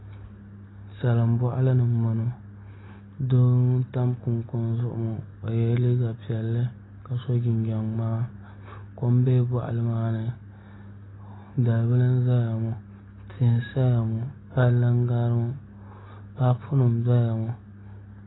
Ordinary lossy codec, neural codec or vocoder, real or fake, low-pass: AAC, 16 kbps; none; real; 7.2 kHz